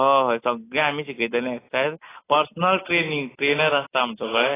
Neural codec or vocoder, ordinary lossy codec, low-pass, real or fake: none; AAC, 16 kbps; 3.6 kHz; real